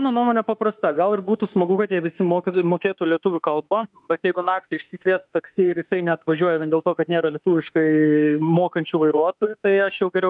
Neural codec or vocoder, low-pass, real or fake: autoencoder, 48 kHz, 32 numbers a frame, DAC-VAE, trained on Japanese speech; 10.8 kHz; fake